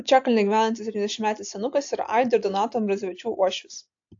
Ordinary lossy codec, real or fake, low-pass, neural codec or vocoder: AAC, 48 kbps; real; 7.2 kHz; none